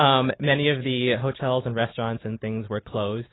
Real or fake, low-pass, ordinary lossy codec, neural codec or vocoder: real; 7.2 kHz; AAC, 16 kbps; none